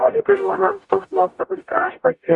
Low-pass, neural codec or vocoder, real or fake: 10.8 kHz; codec, 44.1 kHz, 0.9 kbps, DAC; fake